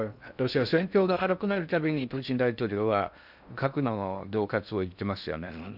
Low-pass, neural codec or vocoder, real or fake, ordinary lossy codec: 5.4 kHz; codec, 16 kHz in and 24 kHz out, 0.6 kbps, FocalCodec, streaming, 4096 codes; fake; none